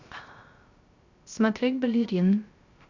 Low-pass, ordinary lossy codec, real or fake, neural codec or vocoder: 7.2 kHz; Opus, 64 kbps; fake; codec, 16 kHz, 0.3 kbps, FocalCodec